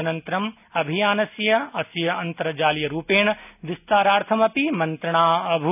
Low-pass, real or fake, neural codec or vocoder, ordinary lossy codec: 3.6 kHz; real; none; none